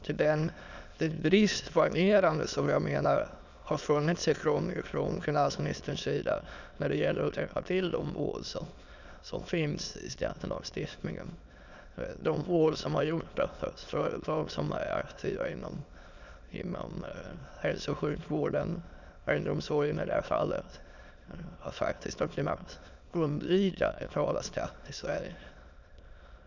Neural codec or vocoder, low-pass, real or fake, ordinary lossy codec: autoencoder, 22.05 kHz, a latent of 192 numbers a frame, VITS, trained on many speakers; 7.2 kHz; fake; none